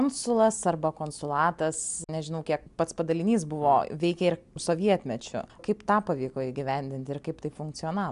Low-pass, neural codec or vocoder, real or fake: 10.8 kHz; none; real